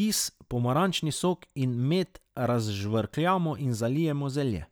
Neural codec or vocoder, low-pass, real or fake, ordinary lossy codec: none; none; real; none